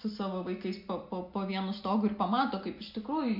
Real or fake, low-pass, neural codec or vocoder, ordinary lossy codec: real; 5.4 kHz; none; MP3, 48 kbps